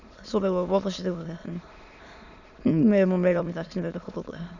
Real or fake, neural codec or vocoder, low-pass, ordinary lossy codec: fake; autoencoder, 22.05 kHz, a latent of 192 numbers a frame, VITS, trained on many speakers; 7.2 kHz; none